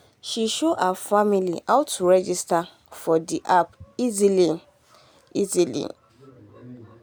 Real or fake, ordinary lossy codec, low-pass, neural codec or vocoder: real; none; none; none